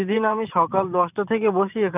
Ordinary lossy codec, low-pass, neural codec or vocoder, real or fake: none; 3.6 kHz; vocoder, 44.1 kHz, 128 mel bands every 512 samples, BigVGAN v2; fake